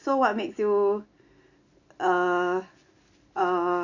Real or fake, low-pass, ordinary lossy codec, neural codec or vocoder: real; 7.2 kHz; none; none